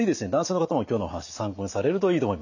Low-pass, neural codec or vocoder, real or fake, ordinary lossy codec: 7.2 kHz; none; real; none